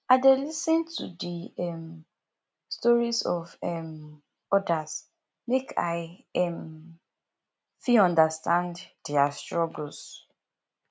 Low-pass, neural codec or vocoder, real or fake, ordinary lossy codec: none; none; real; none